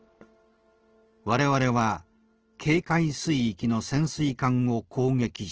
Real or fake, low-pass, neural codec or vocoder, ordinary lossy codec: real; 7.2 kHz; none; Opus, 16 kbps